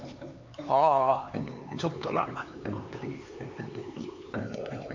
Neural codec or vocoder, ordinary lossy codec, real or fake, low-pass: codec, 16 kHz, 4 kbps, X-Codec, HuBERT features, trained on LibriSpeech; AAC, 48 kbps; fake; 7.2 kHz